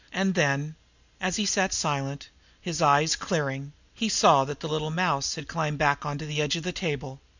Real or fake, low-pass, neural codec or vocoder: real; 7.2 kHz; none